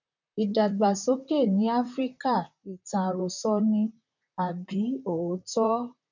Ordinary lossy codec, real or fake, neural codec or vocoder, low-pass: none; fake; vocoder, 44.1 kHz, 128 mel bands, Pupu-Vocoder; 7.2 kHz